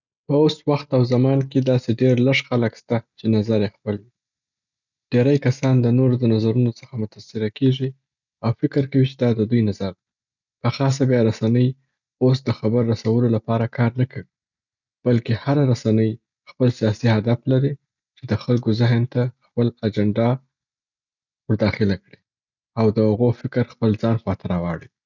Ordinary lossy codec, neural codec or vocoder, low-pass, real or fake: none; none; 7.2 kHz; real